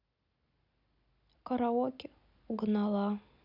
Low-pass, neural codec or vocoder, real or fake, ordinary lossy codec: 5.4 kHz; none; real; none